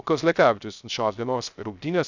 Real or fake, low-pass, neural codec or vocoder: fake; 7.2 kHz; codec, 16 kHz, 0.3 kbps, FocalCodec